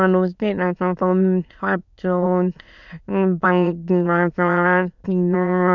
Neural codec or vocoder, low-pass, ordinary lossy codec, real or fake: autoencoder, 22.05 kHz, a latent of 192 numbers a frame, VITS, trained on many speakers; 7.2 kHz; none; fake